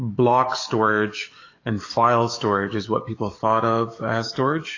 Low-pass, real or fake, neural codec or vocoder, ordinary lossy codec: 7.2 kHz; fake; codec, 44.1 kHz, 7.8 kbps, DAC; AAC, 32 kbps